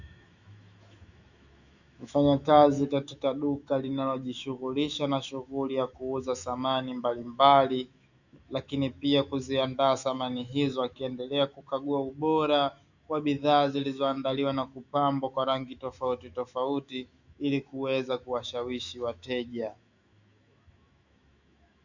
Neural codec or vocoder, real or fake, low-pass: autoencoder, 48 kHz, 128 numbers a frame, DAC-VAE, trained on Japanese speech; fake; 7.2 kHz